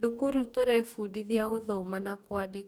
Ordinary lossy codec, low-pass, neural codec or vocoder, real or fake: none; none; codec, 44.1 kHz, 2.6 kbps, DAC; fake